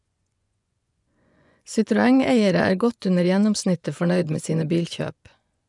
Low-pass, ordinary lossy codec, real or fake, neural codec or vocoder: 10.8 kHz; none; fake; vocoder, 24 kHz, 100 mel bands, Vocos